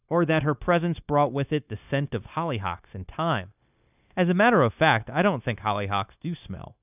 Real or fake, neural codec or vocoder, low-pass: fake; codec, 16 kHz, 0.9 kbps, LongCat-Audio-Codec; 3.6 kHz